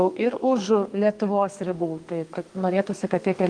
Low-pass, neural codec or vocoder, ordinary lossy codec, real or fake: 9.9 kHz; codec, 32 kHz, 1.9 kbps, SNAC; Opus, 24 kbps; fake